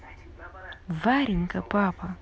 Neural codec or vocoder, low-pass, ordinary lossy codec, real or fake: none; none; none; real